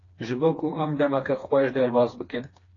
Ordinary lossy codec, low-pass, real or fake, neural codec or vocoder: AAC, 32 kbps; 7.2 kHz; fake; codec, 16 kHz, 2 kbps, FreqCodec, smaller model